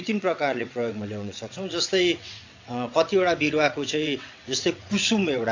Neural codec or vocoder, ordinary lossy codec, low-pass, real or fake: vocoder, 22.05 kHz, 80 mel bands, WaveNeXt; AAC, 48 kbps; 7.2 kHz; fake